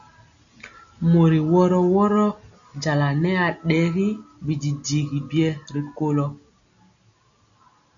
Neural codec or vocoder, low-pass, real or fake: none; 7.2 kHz; real